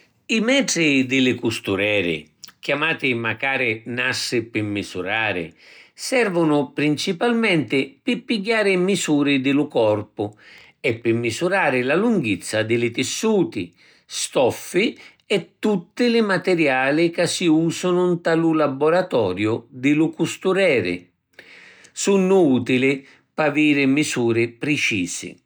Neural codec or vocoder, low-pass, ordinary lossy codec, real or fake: none; none; none; real